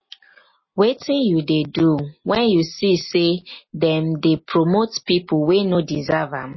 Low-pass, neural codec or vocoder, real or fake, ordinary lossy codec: 7.2 kHz; none; real; MP3, 24 kbps